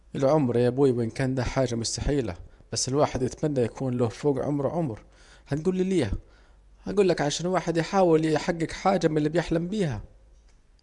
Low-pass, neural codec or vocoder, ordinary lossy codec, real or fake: 10.8 kHz; vocoder, 24 kHz, 100 mel bands, Vocos; none; fake